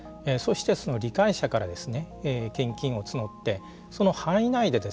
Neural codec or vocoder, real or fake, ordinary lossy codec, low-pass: none; real; none; none